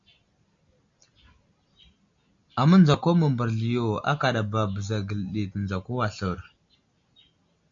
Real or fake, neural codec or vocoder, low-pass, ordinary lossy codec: real; none; 7.2 kHz; MP3, 48 kbps